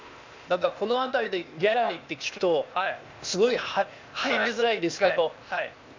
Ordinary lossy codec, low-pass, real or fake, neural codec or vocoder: MP3, 64 kbps; 7.2 kHz; fake; codec, 16 kHz, 0.8 kbps, ZipCodec